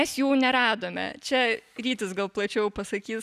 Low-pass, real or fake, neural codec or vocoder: 14.4 kHz; real; none